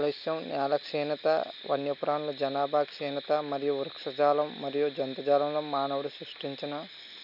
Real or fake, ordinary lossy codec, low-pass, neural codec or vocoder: real; AAC, 48 kbps; 5.4 kHz; none